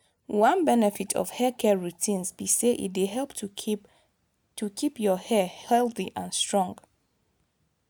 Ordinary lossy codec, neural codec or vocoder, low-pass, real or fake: none; none; none; real